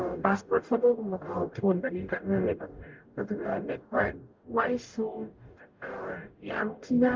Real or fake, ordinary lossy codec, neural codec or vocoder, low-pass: fake; Opus, 32 kbps; codec, 44.1 kHz, 0.9 kbps, DAC; 7.2 kHz